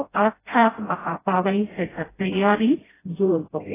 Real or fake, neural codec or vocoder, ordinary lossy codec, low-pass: fake; codec, 16 kHz, 0.5 kbps, FreqCodec, smaller model; AAC, 16 kbps; 3.6 kHz